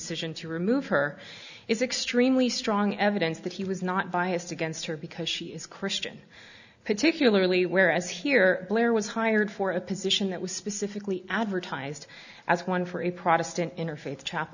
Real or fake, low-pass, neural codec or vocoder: real; 7.2 kHz; none